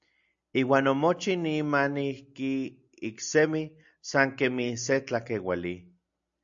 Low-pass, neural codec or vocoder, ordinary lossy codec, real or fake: 7.2 kHz; none; AAC, 64 kbps; real